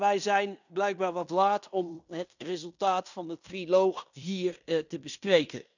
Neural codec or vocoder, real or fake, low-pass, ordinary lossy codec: codec, 16 kHz in and 24 kHz out, 0.9 kbps, LongCat-Audio-Codec, fine tuned four codebook decoder; fake; 7.2 kHz; none